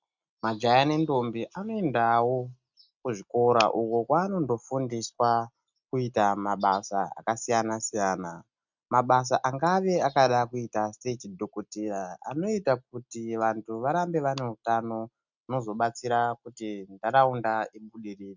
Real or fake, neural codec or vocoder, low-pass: real; none; 7.2 kHz